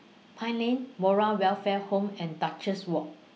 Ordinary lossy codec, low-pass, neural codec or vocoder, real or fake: none; none; none; real